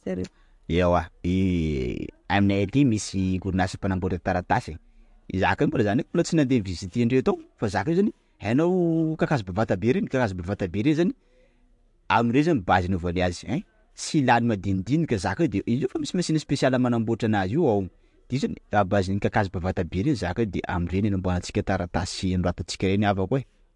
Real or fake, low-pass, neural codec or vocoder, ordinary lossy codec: real; 10.8 kHz; none; MP3, 64 kbps